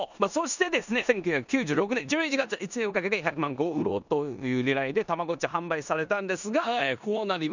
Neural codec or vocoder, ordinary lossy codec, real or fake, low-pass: codec, 16 kHz in and 24 kHz out, 0.9 kbps, LongCat-Audio-Codec, four codebook decoder; none; fake; 7.2 kHz